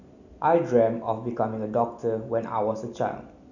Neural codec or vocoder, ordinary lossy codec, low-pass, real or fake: none; none; 7.2 kHz; real